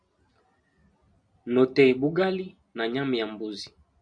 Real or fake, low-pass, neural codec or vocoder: real; 9.9 kHz; none